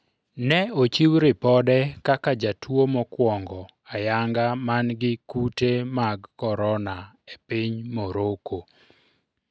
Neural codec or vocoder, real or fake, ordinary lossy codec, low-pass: none; real; none; none